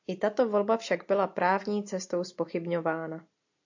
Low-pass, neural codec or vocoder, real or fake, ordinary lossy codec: 7.2 kHz; none; real; AAC, 48 kbps